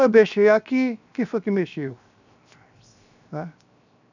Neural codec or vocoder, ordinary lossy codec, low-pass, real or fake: codec, 16 kHz, 0.7 kbps, FocalCodec; none; 7.2 kHz; fake